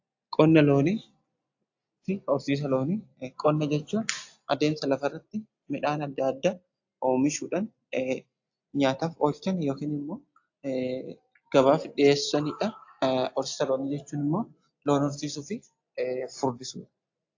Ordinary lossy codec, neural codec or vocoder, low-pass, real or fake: AAC, 48 kbps; none; 7.2 kHz; real